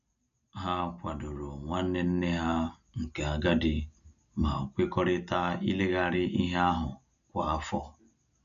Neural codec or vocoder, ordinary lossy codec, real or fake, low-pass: none; none; real; 7.2 kHz